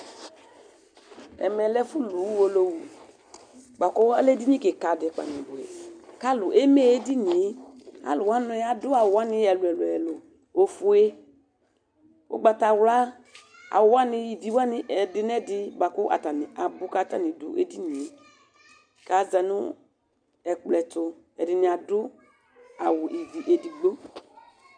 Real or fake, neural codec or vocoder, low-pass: real; none; 9.9 kHz